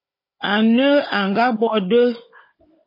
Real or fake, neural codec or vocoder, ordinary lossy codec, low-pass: fake; codec, 16 kHz, 16 kbps, FunCodec, trained on Chinese and English, 50 frames a second; MP3, 24 kbps; 5.4 kHz